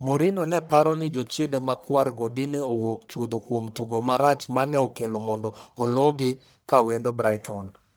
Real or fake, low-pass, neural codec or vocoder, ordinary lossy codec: fake; none; codec, 44.1 kHz, 1.7 kbps, Pupu-Codec; none